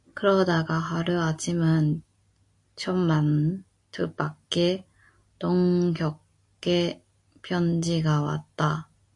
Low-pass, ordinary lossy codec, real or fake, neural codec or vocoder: 10.8 kHz; AAC, 48 kbps; real; none